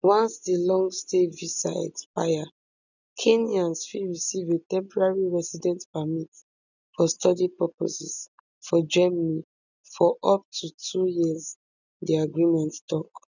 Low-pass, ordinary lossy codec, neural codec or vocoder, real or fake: 7.2 kHz; none; none; real